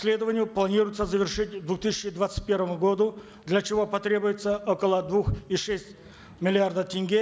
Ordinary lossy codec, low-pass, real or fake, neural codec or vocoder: none; none; real; none